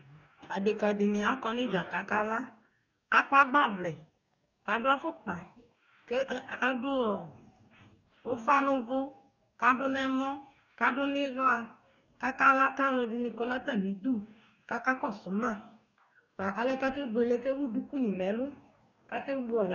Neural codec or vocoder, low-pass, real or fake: codec, 44.1 kHz, 2.6 kbps, DAC; 7.2 kHz; fake